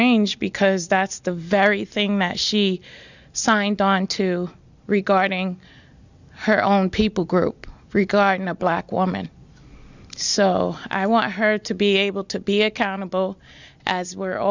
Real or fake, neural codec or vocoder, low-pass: real; none; 7.2 kHz